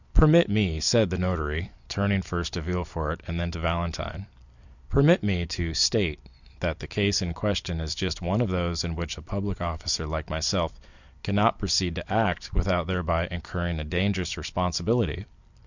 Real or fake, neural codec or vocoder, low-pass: fake; vocoder, 44.1 kHz, 128 mel bands every 256 samples, BigVGAN v2; 7.2 kHz